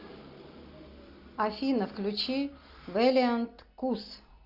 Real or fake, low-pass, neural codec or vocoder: real; 5.4 kHz; none